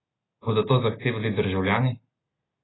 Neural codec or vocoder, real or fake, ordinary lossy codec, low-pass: none; real; AAC, 16 kbps; 7.2 kHz